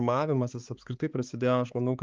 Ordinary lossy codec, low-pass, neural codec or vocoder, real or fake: Opus, 32 kbps; 7.2 kHz; codec, 16 kHz, 4 kbps, X-Codec, HuBERT features, trained on balanced general audio; fake